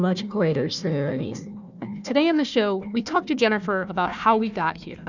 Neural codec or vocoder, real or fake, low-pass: codec, 16 kHz, 1 kbps, FunCodec, trained on Chinese and English, 50 frames a second; fake; 7.2 kHz